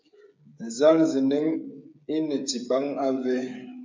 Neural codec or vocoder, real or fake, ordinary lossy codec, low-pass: codec, 16 kHz, 8 kbps, FreqCodec, smaller model; fake; MP3, 64 kbps; 7.2 kHz